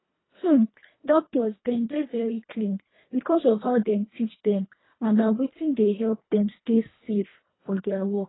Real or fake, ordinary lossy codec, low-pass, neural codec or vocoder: fake; AAC, 16 kbps; 7.2 kHz; codec, 24 kHz, 1.5 kbps, HILCodec